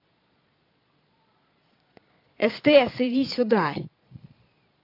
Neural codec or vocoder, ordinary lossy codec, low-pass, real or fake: vocoder, 22.05 kHz, 80 mel bands, Vocos; AAC, 32 kbps; 5.4 kHz; fake